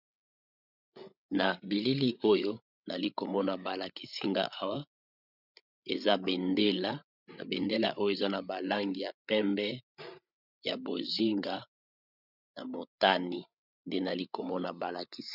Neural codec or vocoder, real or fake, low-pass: codec, 16 kHz, 8 kbps, FreqCodec, larger model; fake; 5.4 kHz